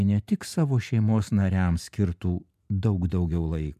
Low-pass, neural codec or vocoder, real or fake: 14.4 kHz; none; real